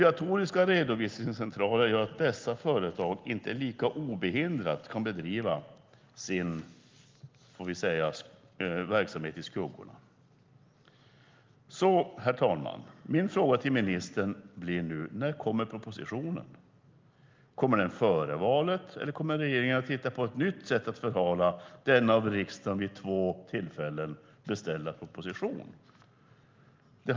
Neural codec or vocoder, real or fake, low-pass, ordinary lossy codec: none; real; 7.2 kHz; Opus, 32 kbps